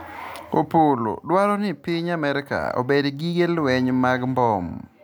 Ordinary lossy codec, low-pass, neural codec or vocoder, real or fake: none; none; none; real